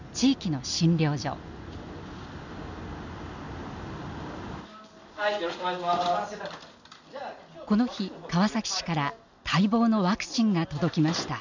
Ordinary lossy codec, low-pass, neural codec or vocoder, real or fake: none; 7.2 kHz; none; real